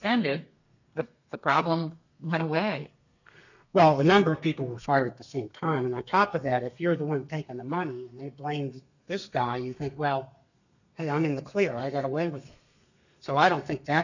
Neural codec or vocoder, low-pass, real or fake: codec, 32 kHz, 1.9 kbps, SNAC; 7.2 kHz; fake